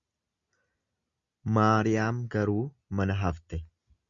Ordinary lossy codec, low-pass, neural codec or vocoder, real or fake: Opus, 64 kbps; 7.2 kHz; none; real